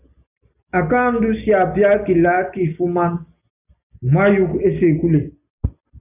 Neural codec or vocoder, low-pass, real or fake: none; 3.6 kHz; real